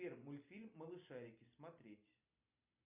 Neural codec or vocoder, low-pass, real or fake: none; 3.6 kHz; real